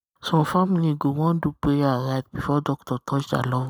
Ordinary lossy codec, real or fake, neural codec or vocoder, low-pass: none; real; none; none